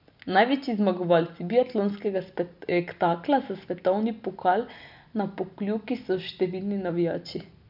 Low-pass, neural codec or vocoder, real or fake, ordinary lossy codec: 5.4 kHz; none; real; none